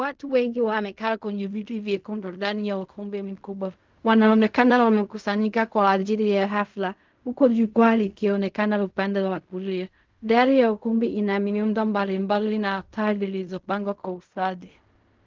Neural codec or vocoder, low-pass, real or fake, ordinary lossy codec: codec, 16 kHz in and 24 kHz out, 0.4 kbps, LongCat-Audio-Codec, fine tuned four codebook decoder; 7.2 kHz; fake; Opus, 24 kbps